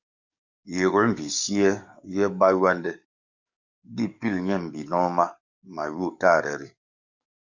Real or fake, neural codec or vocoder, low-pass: fake; codec, 44.1 kHz, 7.8 kbps, DAC; 7.2 kHz